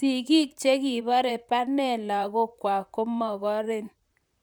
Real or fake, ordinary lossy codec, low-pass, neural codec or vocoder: fake; none; none; vocoder, 44.1 kHz, 128 mel bands, Pupu-Vocoder